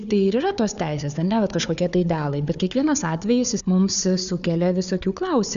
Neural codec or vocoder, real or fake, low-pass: codec, 16 kHz, 4 kbps, FunCodec, trained on Chinese and English, 50 frames a second; fake; 7.2 kHz